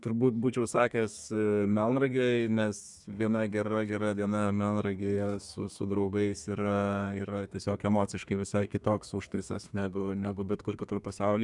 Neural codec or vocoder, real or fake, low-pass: codec, 32 kHz, 1.9 kbps, SNAC; fake; 10.8 kHz